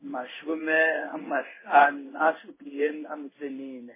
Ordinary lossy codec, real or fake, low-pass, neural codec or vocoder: MP3, 16 kbps; fake; 3.6 kHz; codec, 16 kHz in and 24 kHz out, 1 kbps, XY-Tokenizer